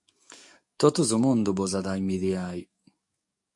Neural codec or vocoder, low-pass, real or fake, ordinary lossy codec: codec, 44.1 kHz, 7.8 kbps, DAC; 10.8 kHz; fake; MP3, 64 kbps